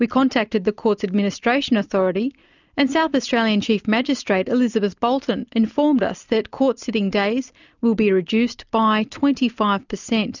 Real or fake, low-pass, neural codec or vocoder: real; 7.2 kHz; none